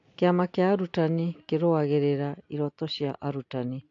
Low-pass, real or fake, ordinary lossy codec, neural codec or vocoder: 7.2 kHz; real; AAC, 48 kbps; none